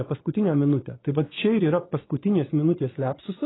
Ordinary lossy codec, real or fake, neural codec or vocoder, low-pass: AAC, 16 kbps; fake; vocoder, 24 kHz, 100 mel bands, Vocos; 7.2 kHz